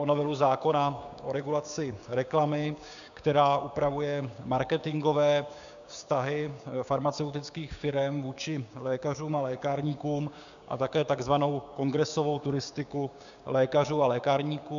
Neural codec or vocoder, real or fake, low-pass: codec, 16 kHz, 6 kbps, DAC; fake; 7.2 kHz